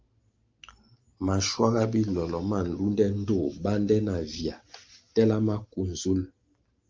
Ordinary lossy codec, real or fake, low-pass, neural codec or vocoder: Opus, 24 kbps; real; 7.2 kHz; none